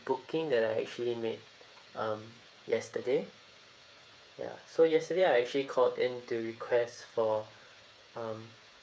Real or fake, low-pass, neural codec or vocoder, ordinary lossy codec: fake; none; codec, 16 kHz, 8 kbps, FreqCodec, smaller model; none